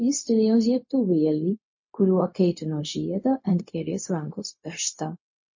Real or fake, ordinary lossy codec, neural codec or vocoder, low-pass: fake; MP3, 32 kbps; codec, 16 kHz, 0.4 kbps, LongCat-Audio-Codec; 7.2 kHz